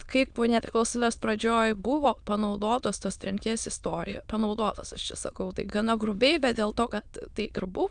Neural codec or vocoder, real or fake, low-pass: autoencoder, 22.05 kHz, a latent of 192 numbers a frame, VITS, trained on many speakers; fake; 9.9 kHz